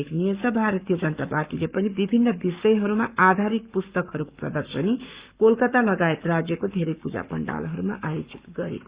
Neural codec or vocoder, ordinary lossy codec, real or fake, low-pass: codec, 44.1 kHz, 7.8 kbps, Pupu-Codec; Opus, 64 kbps; fake; 3.6 kHz